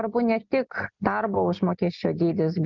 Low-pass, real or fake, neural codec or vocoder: 7.2 kHz; real; none